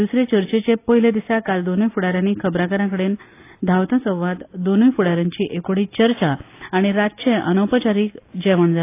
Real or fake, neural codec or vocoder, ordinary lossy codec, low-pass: real; none; AAC, 24 kbps; 3.6 kHz